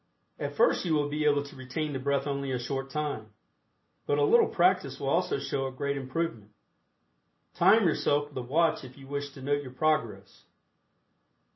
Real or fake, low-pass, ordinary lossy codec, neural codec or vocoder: real; 7.2 kHz; MP3, 24 kbps; none